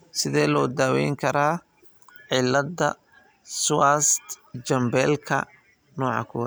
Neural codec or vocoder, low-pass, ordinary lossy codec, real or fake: none; none; none; real